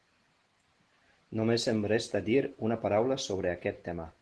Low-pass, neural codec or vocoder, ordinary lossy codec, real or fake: 10.8 kHz; none; Opus, 16 kbps; real